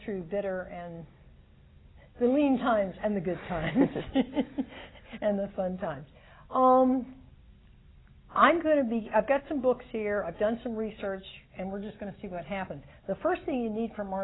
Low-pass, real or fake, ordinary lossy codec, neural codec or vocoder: 7.2 kHz; real; AAC, 16 kbps; none